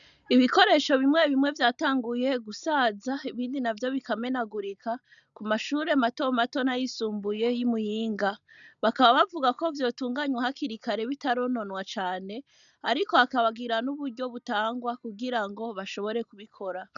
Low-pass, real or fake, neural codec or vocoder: 7.2 kHz; real; none